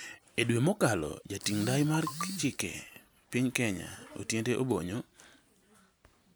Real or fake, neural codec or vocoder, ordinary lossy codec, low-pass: real; none; none; none